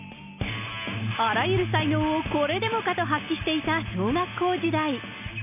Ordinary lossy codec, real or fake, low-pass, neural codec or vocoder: none; real; 3.6 kHz; none